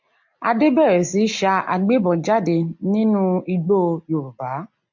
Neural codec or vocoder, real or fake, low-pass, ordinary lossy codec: none; real; 7.2 kHz; MP3, 64 kbps